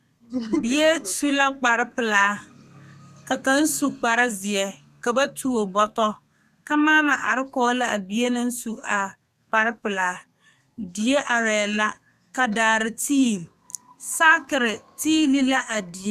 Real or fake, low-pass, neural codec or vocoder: fake; 14.4 kHz; codec, 32 kHz, 1.9 kbps, SNAC